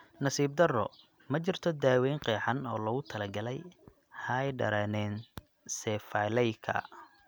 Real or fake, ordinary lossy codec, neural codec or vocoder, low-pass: real; none; none; none